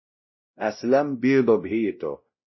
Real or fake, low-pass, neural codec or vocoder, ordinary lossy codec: fake; 7.2 kHz; codec, 16 kHz, 0.5 kbps, X-Codec, WavLM features, trained on Multilingual LibriSpeech; MP3, 24 kbps